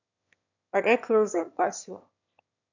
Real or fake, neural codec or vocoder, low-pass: fake; autoencoder, 22.05 kHz, a latent of 192 numbers a frame, VITS, trained on one speaker; 7.2 kHz